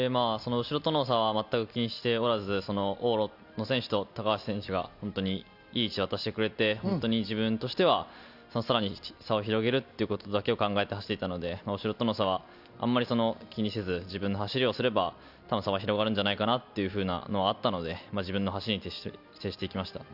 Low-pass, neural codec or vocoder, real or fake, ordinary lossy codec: 5.4 kHz; none; real; none